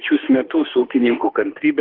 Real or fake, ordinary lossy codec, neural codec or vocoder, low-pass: fake; Opus, 16 kbps; autoencoder, 48 kHz, 32 numbers a frame, DAC-VAE, trained on Japanese speech; 5.4 kHz